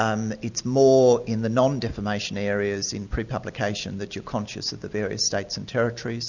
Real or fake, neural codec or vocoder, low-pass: real; none; 7.2 kHz